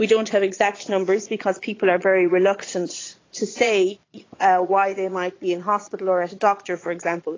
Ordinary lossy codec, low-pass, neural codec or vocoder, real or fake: AAC, 32 kbps; 7.2 kHz; vocoder, 22.05 kHz, 80 mel bands, Vocos; fake